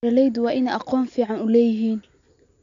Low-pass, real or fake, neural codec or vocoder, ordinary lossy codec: 7.2 kHz; real; none; MP3, 64 kbps